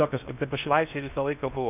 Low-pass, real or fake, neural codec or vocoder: 3.6 kHz; fake; codec, 16 kHz in and 24 kHz out, 0.6 kbps, FocalCodec, streaming, 4096 codes